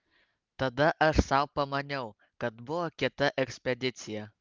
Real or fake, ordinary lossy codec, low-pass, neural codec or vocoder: real; Opus, 24 kbps; 7.2 kHz; none